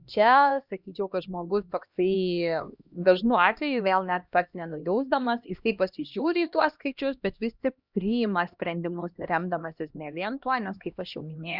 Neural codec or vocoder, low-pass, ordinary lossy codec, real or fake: codec, 16 kHz, 1 kbps, X-Codec, HuBERT features, trained on LibriSpeech; 5.4 kHz; Opus, 64 kbps; fake